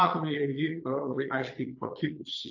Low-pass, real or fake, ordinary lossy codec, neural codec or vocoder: 7.2 kHz; fake; AAC, 48 kbps; vocoder, 22.05 kHz, 80 mel bands, Vocos